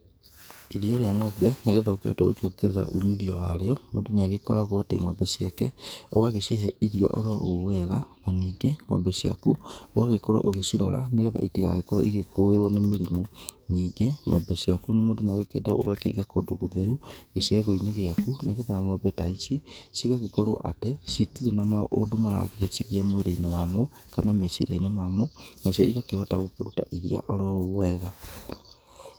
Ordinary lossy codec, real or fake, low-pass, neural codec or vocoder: none; fake; none; codec, 44.1 kHz, 2.6 kbps, SNAC